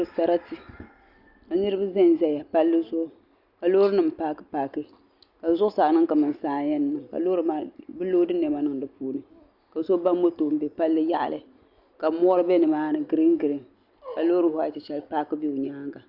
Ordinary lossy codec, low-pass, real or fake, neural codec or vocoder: Opus, 64 kbps; 5.4 kHz; real; none